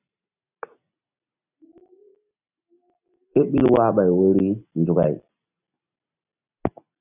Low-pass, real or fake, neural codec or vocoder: 3.6 kHz; real; none